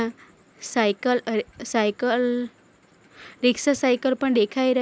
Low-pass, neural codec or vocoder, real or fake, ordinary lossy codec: none; none; real; none